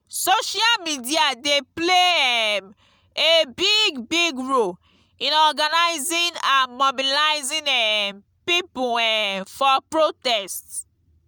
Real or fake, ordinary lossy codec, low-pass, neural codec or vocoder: real; none; none; none